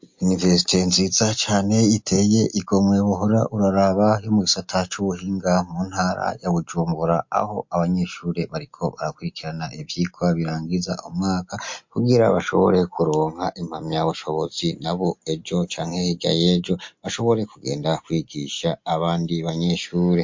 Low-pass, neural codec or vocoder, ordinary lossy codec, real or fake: 7.2 kHz; none; MP3, 48 kbps; real